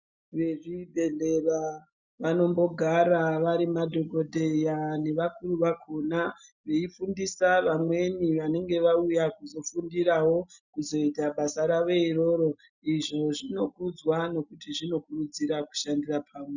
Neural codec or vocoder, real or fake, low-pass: none; real; 7.2 kHz